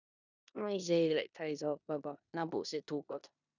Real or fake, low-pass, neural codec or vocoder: fake; 7.2 kHz; codec, 16 kHz in and 24 kHz out, 0.9 kbps, LongCat-Audio-Codec, four codebook decoder